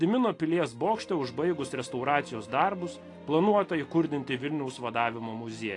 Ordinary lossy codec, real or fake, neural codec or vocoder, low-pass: AAC, 48 kbps; real; none; 10.8 kHz